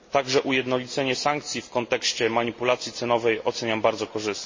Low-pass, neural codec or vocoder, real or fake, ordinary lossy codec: 7.2 kHz; none; real; MP3, 32 kbps